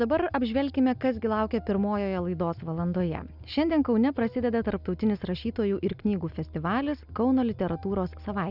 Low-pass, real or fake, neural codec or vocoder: 5.4 kHz; real; none